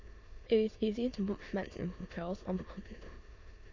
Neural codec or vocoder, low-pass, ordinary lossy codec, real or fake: autoencoder, 22.05 kHz, a latent of 192 numbers a frame, VITS, trained on many speakers; 7.2 kHz; AAC, 48 kbps; fake